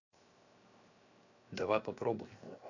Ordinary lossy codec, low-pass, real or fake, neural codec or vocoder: none; 7.2 kHz; fake; codec, 16 kHz, 0.7 kbps, FocalCodec